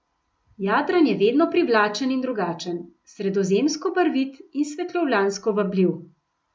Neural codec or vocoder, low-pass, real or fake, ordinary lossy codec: none; none; real; none